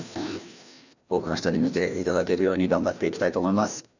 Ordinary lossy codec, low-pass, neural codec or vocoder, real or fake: none; 7.2 kHz; codec, 16 kHz, 1 kbps, FreqCodec, larger model; fake